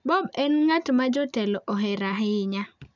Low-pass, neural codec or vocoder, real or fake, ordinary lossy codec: 7.2 kHz; none; real; none